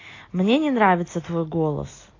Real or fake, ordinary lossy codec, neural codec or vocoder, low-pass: real; AAC, 32 kbps; none; 7.2 kHz